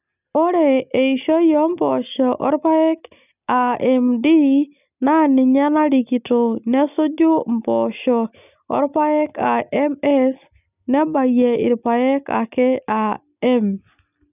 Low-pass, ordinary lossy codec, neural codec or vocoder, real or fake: 3.6 kHz; none; none; real